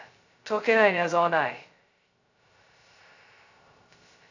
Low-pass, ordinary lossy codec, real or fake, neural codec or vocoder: 7.2 kHz; AAC, 48 kbps; fake; codec, 16 kHz, 0.2 kbps, FocalCodec